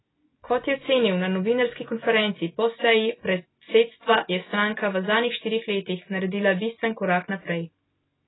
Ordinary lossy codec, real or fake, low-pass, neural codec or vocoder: AAC, 16 kbps; real; 7.2 kHz; none